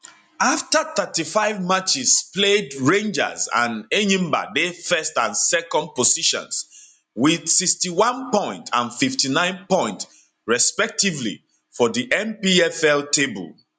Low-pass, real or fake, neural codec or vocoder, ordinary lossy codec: 9.9 kHz; real; none; none